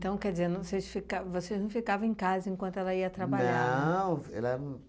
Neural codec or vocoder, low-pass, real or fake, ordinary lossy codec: none; none; real; none